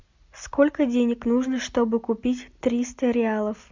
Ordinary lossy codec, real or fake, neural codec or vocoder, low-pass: MP3, 64 kbps; real; none; 7.2 kHz